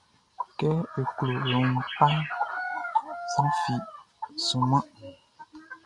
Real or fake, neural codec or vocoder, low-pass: real; none; 10.8 kHz